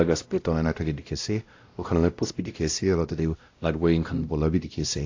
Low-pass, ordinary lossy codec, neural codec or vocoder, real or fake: 7.2 kHz; AAC, 48 kbps; codec, 16 kHz, 0.5 kbps, X-Codec, WavLM features, trained on Multilingual LibriSpeech; fake